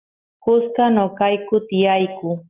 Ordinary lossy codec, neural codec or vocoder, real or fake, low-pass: Opus, 24 kbps; none; real; 3.6 kHz